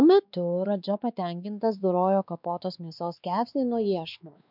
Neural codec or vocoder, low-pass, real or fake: codec, 16 kHz, 2 kbps, X-Codec, WavLM features, trained on Multilingual LibriSpeech; 5.4 kHz; fake